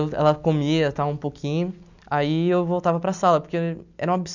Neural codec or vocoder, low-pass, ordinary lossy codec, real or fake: none; 7.2 kHz; none; real